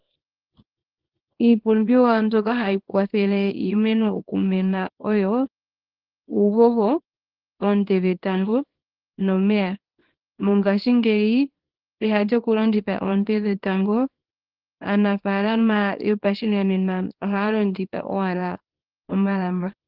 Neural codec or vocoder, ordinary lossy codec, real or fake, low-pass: codec, 24 kHz, 0.9 kbps, WavTokenizer, small release; Opus, 16 kbps; fake; 5.4 kHz